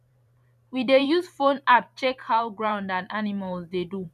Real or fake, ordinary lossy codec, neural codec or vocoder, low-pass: fake; none; vocoder, 44.1 kHz, 128 mel bands every 512 samples, BigVGAN v2; 14.4 kHz